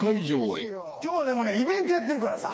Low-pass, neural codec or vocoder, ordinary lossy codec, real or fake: none; codec, 16 kHz, 2 kbps, FreqCodec, smaller model; none; fake